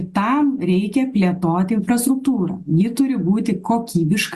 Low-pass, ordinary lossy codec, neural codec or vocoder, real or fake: 14.4 kHz; Opus, 64 kbps; none; real